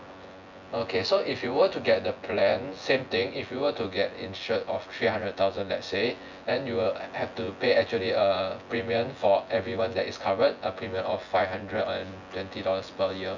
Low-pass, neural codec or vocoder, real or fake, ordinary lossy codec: 7.2 kHz; vocoder, 24 kHz, 100 mel bands, Vocos; fake; none